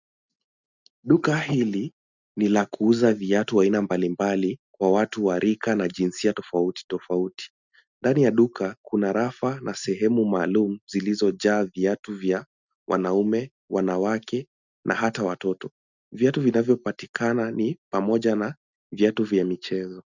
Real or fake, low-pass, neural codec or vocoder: real; 7.2 kHz; none